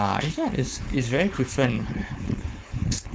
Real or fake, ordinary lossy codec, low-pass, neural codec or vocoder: fake; none; none; codec, 16 kHz, 4.8 kbps, FACodec